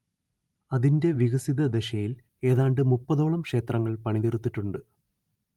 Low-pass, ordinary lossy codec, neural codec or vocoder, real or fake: 19.8 kHz; Opus, 32 kbps; vocoder, 44.1 kHz, 128 mel bands every 512 samples, BigVGAN v2; fake